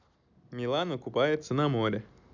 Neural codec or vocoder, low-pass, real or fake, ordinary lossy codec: none; 7.2 kHz; real; none